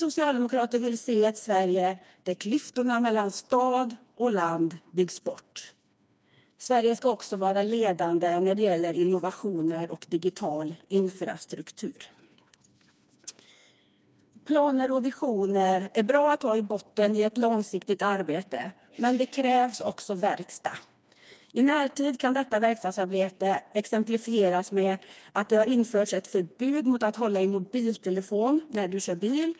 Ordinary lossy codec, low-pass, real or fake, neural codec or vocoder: none; none; fake; codec, 16 kHz, 2 kbps, FreqCodec, smaller model